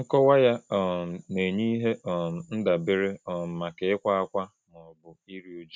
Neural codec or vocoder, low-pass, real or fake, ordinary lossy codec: none; none; real; none